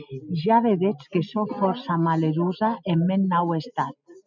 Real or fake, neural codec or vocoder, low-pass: real; none; 7.2 kHz